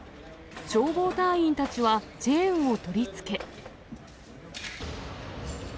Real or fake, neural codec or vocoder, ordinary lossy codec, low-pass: real; none; none; none